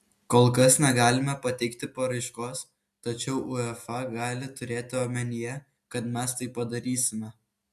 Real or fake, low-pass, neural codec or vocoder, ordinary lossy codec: real; 14.4 kHz; none; AAC, 96 kbps